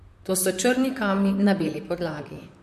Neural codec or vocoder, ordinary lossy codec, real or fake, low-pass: vocoder, 44.1 kHz, 128 mel bands, Pupu-Vocoder; MP3, 64 kbps; fake; 14.4 kHz